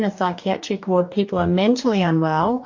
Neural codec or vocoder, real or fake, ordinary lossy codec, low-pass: codec, 44.1 kHz, 2.6 kbps, DAC; fake; MP3, 48 kbps; 7.2 kHz